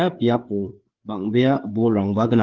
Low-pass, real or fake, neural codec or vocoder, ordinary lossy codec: 7.2 kHz; fake; codec, 16 kHz, 4 kbps, FreqCodec, larger model; Opus, 16 kbps